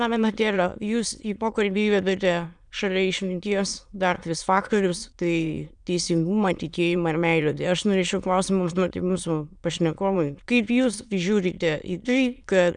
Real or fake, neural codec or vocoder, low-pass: fake; autoencoder, 22.05 kHz, a latent of 192 numbers a frame, VITS, trained on many speakers; 9.9 kHz